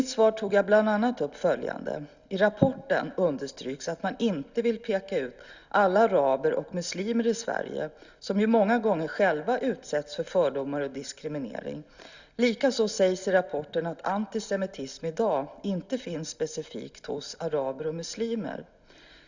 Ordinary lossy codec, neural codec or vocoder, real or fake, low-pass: Opus, 64 kbps; none; real; 7.2 kHz